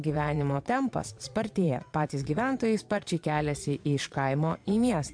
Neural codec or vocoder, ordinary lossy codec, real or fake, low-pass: vocoder, 22.05 kHz, 80 mel bands, Vocos; MP3, 64 kbps; fake; 9.9 kHz